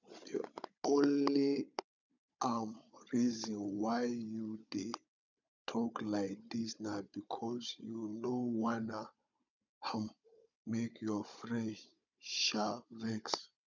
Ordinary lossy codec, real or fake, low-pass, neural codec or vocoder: none; fake; 7.2 kHz; codec, 16 kHz, 16 kbps, FunCodec, trained on Chinese and English, 50 frames a second